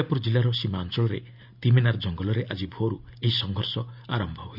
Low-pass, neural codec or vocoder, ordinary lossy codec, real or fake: 5.4 kHz; none; none; real